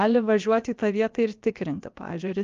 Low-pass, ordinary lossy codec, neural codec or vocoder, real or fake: 7.2 kHz; Opus, 32 kbps; codec, 16 kHz, about 1 kbps, DyCAST, with the encoder's durations; fake